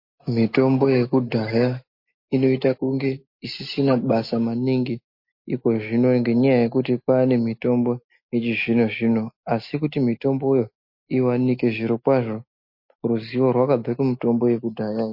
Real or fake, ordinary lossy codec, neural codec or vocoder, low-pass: real; MP3, 32 kbps; none; 5.4 kHz